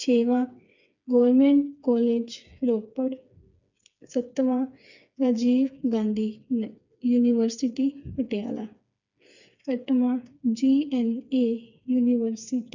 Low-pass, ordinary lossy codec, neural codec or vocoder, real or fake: 7.2 kHz; none; codec, 16 kHz, 4 kbps, FreqCodec, smaller model; fake